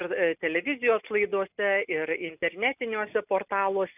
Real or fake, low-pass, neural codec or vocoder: real; 3.6 kHz; none